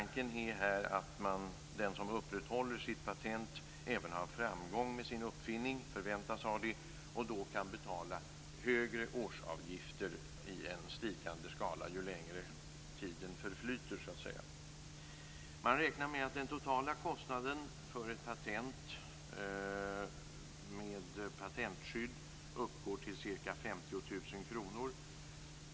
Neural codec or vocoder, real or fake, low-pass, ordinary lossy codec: none; real; none; none